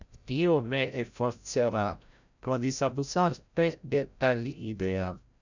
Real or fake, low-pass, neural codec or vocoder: fake; 7.2 kHz; codec, 16 kHz, 0.5 kbps, FreqCodec, larger model